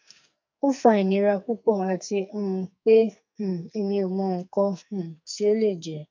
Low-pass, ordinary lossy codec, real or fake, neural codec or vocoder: 7.2 kHz; MP3, 64 kbps; fake; codec, 44.1 kHz, 2.6 kbps, SNAC